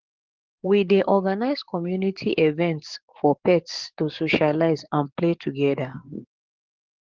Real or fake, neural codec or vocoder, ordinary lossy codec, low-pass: fake; codec, 44.1 kHz, 7.8 kbps, DAC; Opus, 16 kbps; 7.2 kHz